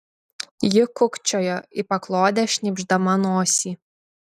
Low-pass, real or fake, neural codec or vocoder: 14.4 kHz; real; none